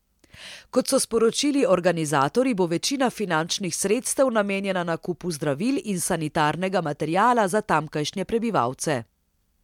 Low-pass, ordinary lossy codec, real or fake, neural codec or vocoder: 19.8 kHz; MP3, 96 kbps; real; none